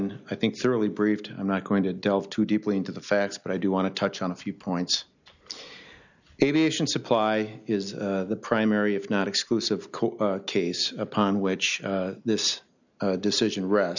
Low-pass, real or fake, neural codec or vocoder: 7.2 kHz; real; none